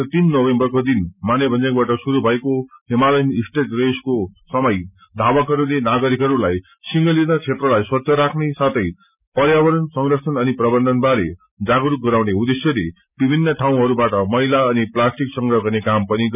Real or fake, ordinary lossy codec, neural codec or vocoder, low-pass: real; none; none; 3.6 kHz